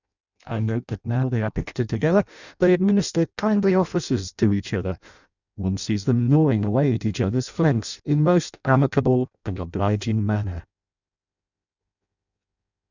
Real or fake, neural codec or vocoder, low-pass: fake; codec, 16 kHz in and 24 kHz out, 0.6 kbps, FireRedTTS-2 codec; 7.2 kHz